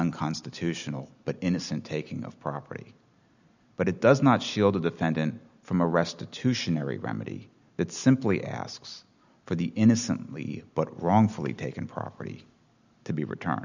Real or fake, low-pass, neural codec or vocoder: real; 7.2 kHz; none